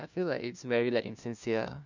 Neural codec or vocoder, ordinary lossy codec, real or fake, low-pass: codec, 16 kHz, 1 kbps, FunCodec, trained on LibriTTS, 50 frames a second; none; fake; 7.2 kHz